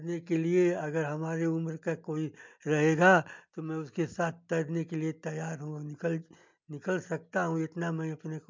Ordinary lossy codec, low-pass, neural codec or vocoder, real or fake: MP3, 64 kbps; 7.2 kHz; none; real